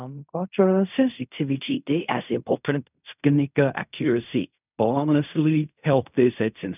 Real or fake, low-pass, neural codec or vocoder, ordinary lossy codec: fake; 3.6 kHz; codec, 16 kHz in and 24 kHz out, 0.4 kbps, LongCat-Audio-Codec, fine tuned four codebook decoder; none